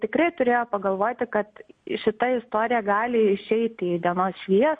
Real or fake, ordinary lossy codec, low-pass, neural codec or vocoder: real; MP3, 48 kbps; 9.9 kHz; none